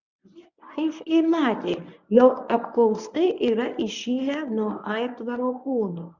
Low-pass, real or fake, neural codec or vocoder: 7.2 kHz; fake; codec, 24 kHz, 0.9 kbps, WavTokenizer, medium speech release version 1